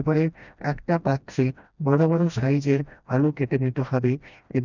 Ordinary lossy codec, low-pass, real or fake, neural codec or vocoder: none; 7.2 kHz; fake; codec, 16 kHz, 1 kbps, FreqCodec, smaller model